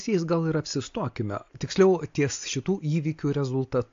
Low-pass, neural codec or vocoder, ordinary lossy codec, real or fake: 7.2 kHz; none; AAC, 48 kbps; real